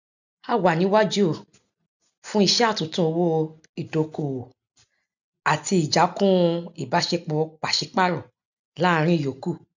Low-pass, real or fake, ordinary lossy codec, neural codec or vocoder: 7.2 kHz; real; none; none